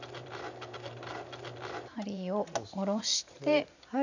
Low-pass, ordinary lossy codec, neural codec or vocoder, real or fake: 7.2 kHz; none; vocoder, 44.1 kHz, 128 mel bands every 512 samples, BigVGAN v2; fake